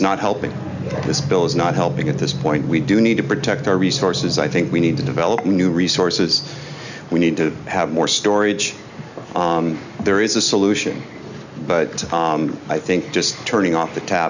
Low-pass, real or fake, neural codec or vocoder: 7.2 kHz; real; none